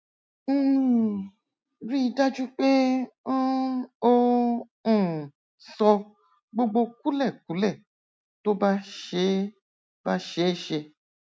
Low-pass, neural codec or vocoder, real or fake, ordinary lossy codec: none; none; real; none